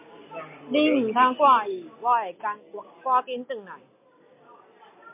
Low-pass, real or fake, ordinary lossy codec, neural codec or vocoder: 3.6 kHz; real; MP3, 24 kbps; none